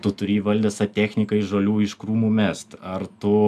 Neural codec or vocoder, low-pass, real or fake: none; 14.4 kHz; real